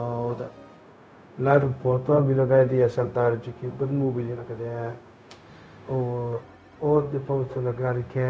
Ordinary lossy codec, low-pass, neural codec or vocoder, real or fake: none; none; codec, 16 kHz, 0.4 kbps, LongCat-Audio-Codec; fake